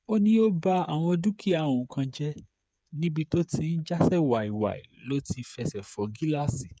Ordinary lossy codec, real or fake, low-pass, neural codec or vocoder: none; fake; none; codec, 16 kHz, 8 kbps, FreqCodec, smaller model